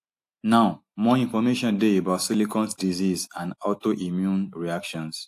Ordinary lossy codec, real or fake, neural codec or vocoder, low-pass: AAC, 64 kbps; real; none; 14.4 kHz